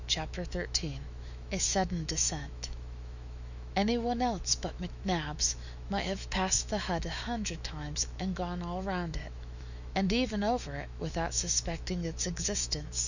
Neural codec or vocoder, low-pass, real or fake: autoencoder, 48 kHz, 128 numbers a frame, DAC-VAE, trained on Japanese speech; 7.2 kHz; fake